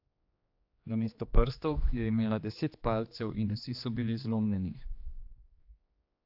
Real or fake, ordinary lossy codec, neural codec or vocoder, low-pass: fake; AAC, 48 kbps; codec, 16 kHz, 2 kbps, X-Codec, HuBERT features, trained on general audio; 5.4 kHz